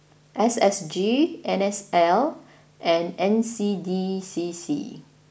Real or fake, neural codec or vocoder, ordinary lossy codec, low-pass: real; none; none; none